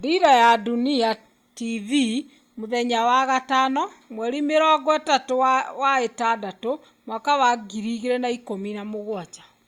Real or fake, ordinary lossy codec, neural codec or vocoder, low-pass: real; Opus, 64 kbps; none; 19.8 kHz